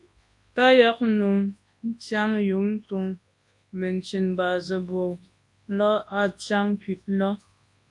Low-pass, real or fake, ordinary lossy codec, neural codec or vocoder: 10.8 kHz; fake; AAC, 64 kbps; codec, 24 kHz, 0.9 kbps, WavTokenizer, large speech release